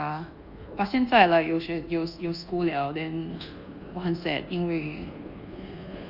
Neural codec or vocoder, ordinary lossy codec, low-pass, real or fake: codec, 24 kHz, 1.2 kbps, DualCodec; Opus, 64 kbps; 5.4 kHz; fake